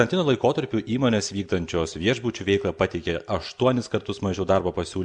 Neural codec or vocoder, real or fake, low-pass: none; real; 9.9 kHz